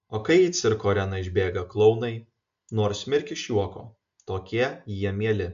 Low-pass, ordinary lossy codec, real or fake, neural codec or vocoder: 7.2 kHz; MP3, 64 kbps; real; none